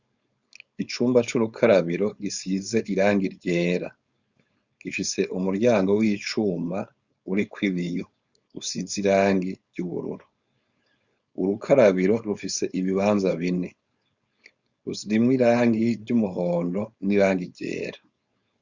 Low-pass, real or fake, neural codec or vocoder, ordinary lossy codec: 7.2 kHz; fake; codec, 16 kHz, 4.8 kbps, FACodec; Opus, 64 kbps